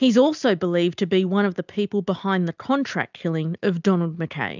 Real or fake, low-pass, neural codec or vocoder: real; 7.2 kHz; none